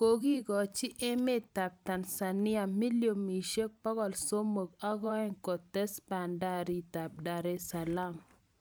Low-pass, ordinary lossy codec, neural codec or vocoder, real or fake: none; none; vocoder, 44.1 kHz, 128 mel bands every 512 samples, BigVGAN v2; fake